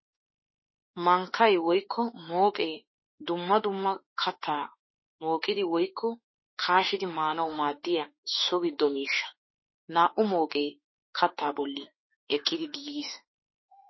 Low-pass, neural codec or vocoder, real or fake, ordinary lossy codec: 7.2 kHz; autoencoder, 48 kHz, 32 numbers a frame, DAC-VAE, trained on Japanese speech; fake; MP3, 24 kbps